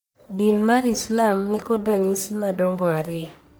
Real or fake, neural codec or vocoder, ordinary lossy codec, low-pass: fake; codec, 44.1 kHz, 1.7 kbps, Pupu-Codec; none; none